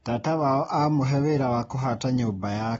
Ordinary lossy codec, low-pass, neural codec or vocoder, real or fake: AAC, 24 kbps; 14.4 kHz; none; real